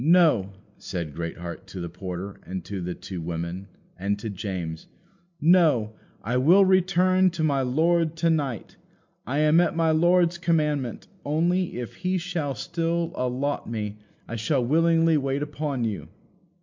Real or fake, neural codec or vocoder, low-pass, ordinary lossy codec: real; none; 7.2 kHz; MP3, 64 kbps